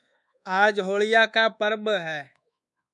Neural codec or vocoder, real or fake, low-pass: codec, 24 kHz, 1.2 kbps, DualCodec; fake; 10.8 kHz